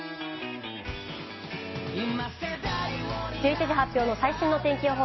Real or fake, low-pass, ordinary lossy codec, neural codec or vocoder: real; 7.2 kHz; MP3, 24 kbps; none